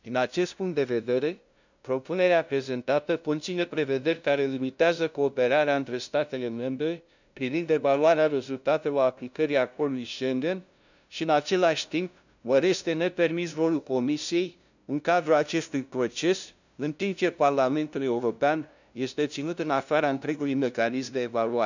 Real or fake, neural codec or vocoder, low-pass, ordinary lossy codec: fake; codec, 16 kHz, 0.5 kbps, FunCodec, trained on LibriTTS, 25 frames a second; 7.2 kHz; none